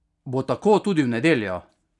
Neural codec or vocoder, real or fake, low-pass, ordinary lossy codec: none; real; 10.8 kHz; none